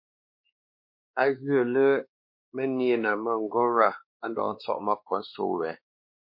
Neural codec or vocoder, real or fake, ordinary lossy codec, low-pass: codec, 16 kHz, 2 kbps, X-Codec, WavLM features, trained on Multilingual LibriSpeech; fake; MP3, 32 kbps; 5.4 kHz